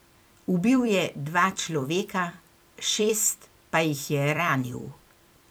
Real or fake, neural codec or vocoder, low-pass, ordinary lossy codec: fake; vocoder, 44.1 kHz, 128 mel bands every 256 samples, BigVGAN v2; none; none